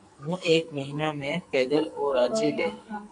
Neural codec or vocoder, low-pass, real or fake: codec, 44.1 kHz, 2.6 kbps, SNAC; 10.8 kHz; fake